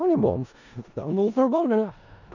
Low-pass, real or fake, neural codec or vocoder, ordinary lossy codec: 7.2 kHz; fake; codec, 16 kHz in and 24 kHz out, 0.4 kbps, LongCat-Audio-Codec, four codebook decoder; none